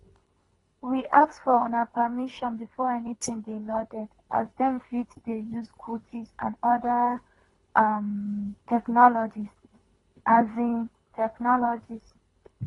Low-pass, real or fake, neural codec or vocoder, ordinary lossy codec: 10.8 kHz; fake; codec, 24 kHz, 3 kbps, HILCodec; AAC, 32 kbps